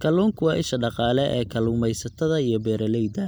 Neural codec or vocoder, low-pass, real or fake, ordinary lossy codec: none; none; real; none